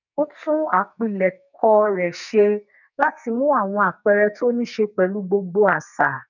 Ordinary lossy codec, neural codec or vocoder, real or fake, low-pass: none; codec, 44.1 kHz, 2.6 kbps, SNAC; fake; 7.2 kHz